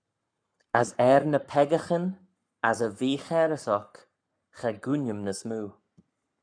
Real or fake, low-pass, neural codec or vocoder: fake; 9.9 kHz; codec, 44.1 kHz, 7.8 kbps, Pupu-Codec